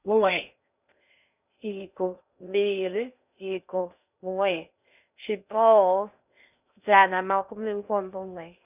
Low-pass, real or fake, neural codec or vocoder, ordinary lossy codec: 3.6 kHz; fake; codec, 16 kHz in and 24 kHz out, 0.6 kbps, FocalCodec, streaming, 2048 codes; none